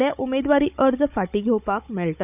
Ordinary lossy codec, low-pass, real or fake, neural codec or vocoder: none; 3.6 kHz; fake; codec, 16 kHz, 16 kbps, FunCodec, trained on Chinese and English, 50 frames a second